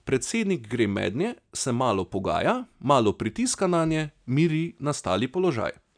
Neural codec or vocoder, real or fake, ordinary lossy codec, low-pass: none; real; none; 9.9 kHz